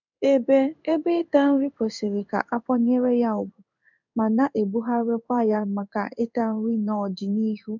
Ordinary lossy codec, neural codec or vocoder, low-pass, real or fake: none; codec, 16 kHz in and 24 kHz out, 1 kbps, XY-Tokenizer; 7.2 kHz; fake